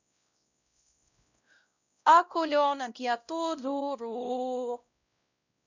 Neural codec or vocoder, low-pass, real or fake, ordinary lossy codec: codec, 16 kHz, 0.5 kbps, X-Codec, WavLM features, trained on Multilingual LibriSpeech; 7.2 kHz; fake; none